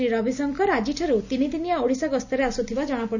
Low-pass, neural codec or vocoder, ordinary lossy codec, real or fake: 7.2 kHz; none; none; real